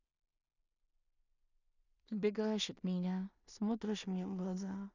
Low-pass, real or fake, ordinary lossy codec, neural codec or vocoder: 7.2 kHz; fake; none; codec, 16 kHz in and 24 kHz out, 0.4 kbps, LongCat-Audio-Codec, two codebook decoder